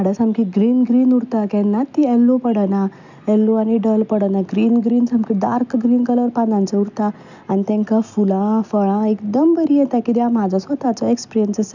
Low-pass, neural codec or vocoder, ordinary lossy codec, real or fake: 7.2 kHz; none; none; real